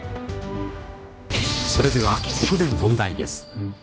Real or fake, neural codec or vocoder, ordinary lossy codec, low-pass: fake; codec, 16 kHz, 1 kbps, X-Codec, HuBERT features, trained on general audio; none; none